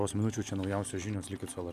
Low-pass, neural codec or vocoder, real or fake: 14.4 kHz; none; real